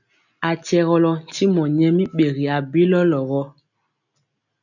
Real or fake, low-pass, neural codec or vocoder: real; 7.2 kHz; none